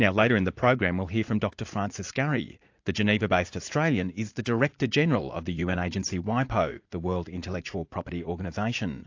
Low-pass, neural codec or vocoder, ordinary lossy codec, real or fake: 7.2 kHz; vocoder, 44.1 kHz, 80 mel bands, Vocos; AAC, 48 kbps; fake